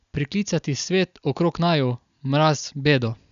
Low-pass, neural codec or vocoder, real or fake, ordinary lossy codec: 7.2 kHz; none; real; none